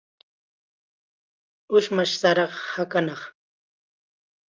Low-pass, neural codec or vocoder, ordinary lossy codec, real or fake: 7.2 kHz; none; Opus, 32 kbps; real